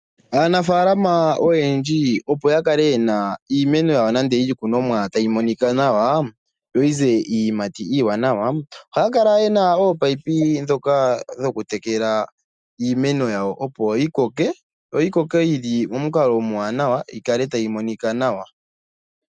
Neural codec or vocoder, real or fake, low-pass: none; real; 9.9 kHz